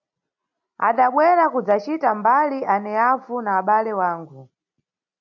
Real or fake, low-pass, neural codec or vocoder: real; 7.2 kHz; none